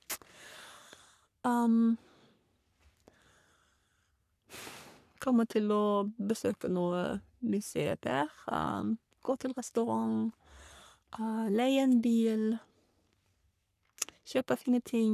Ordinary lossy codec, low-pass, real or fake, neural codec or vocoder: none; 14.4 kHz; fake; codec, 44.1 kHz, 3.4 kbps, Pupu-Codec